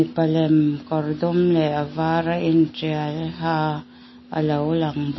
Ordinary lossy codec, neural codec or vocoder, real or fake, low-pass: MP3, 24 kbps; none; real; 7.2 kHz